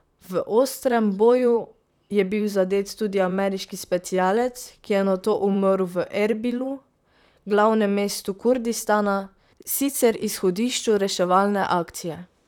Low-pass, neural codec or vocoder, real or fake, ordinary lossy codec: 19.8 kHz; vocoder, 44.1 kHz, 128 mel bands, Pupu-Vocoder; fake; none